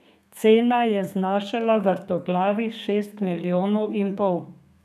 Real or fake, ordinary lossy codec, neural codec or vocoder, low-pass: fake; none; codec, 32 kHz, 1.9 kbps, SNAC; 14.4 kHz